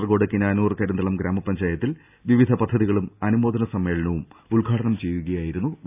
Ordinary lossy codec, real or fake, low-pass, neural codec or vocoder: AAC, 32 kbps; real; 3.6 kHz; none